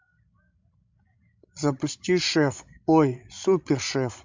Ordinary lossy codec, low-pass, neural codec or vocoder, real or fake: MP3, 64 kbps; 7.2 kHz; codec, 16 kHz, 16 kbps, FreqCodec, larger model; fake